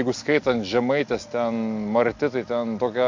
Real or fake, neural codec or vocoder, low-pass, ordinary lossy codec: real; none; 7.2 kHz; MP3, 48 kbps